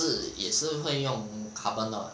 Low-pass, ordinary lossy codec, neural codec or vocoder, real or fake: none; none; none; real